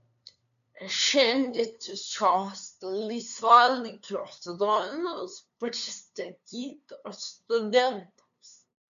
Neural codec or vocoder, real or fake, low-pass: codec, 16 kHz, 2 kbps, FunCodec, trained on LibriTTS, 25 frames a second; fake; 7.2 kHz